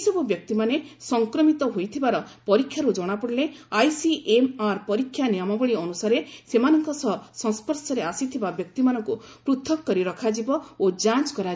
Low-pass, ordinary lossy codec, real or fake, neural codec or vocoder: none; none; real; none